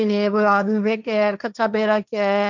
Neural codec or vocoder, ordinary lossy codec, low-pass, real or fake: codec, 16 kHz, 1.1 kbps, Voila-Tokenizer; none; 7.2 kHz; fake